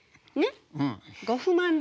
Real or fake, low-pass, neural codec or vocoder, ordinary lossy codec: real; none; none; none